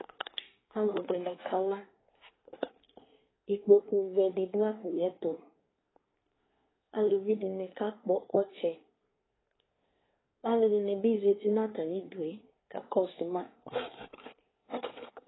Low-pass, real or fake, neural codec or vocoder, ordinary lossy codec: 7.2 kHz; fake; codec, 24 kHz, 1 kbps, SNAC; AAC, 16 kbps